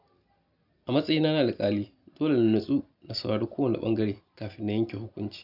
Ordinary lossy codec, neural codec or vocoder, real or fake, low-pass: none; none; real; 5.4 kHz